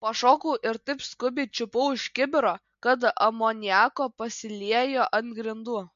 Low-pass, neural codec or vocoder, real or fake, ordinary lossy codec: 7.2 kHz; none; real; MP3, 48 kbps